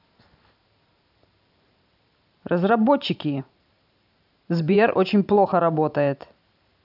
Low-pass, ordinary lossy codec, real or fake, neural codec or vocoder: 5.4 kHz; none; fake; vocoder, 44.1 kHz, 128 mel bands every 512 samples, BigVGAN v2